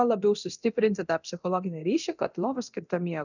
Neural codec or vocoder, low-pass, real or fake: codec, 24 kHz, 0.9 kbps, DualCodec; 7.2 kHz; fake